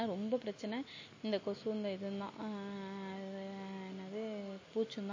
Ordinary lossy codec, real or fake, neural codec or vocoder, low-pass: MP3, 32 kbps; real; none; 7.2 kHz